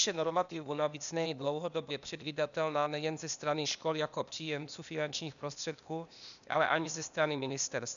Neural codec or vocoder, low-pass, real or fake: codec, 16 kHz, 0.8 kbps, ZipCodec; 7.2 kHz; fake